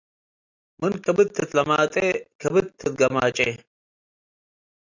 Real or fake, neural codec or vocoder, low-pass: real; none; 7.2 kHz